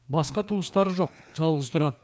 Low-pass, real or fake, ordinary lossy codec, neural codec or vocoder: none; fake; none; codec, 16 kHz, 2 kbps, FreqCodec, larger model